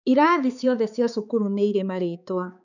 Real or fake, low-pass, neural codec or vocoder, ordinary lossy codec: fake; 7.2 kHz; codec, 16 kHz, 4 kbps, X-Codec, HuBERT features, trained on balanced general audio; none